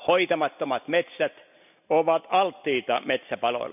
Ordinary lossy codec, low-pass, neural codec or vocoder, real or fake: none; 3.6 kHz; none; real